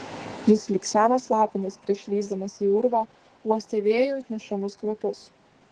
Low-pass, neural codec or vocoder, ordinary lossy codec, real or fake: 10.8 kHz; codec, 44.1 kHz, 2.6 kbps, SNAC; Opus, 16 kbps; fake